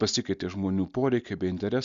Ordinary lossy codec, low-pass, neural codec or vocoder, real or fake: Opus, 64 kbps; 7.2 kHz; none; real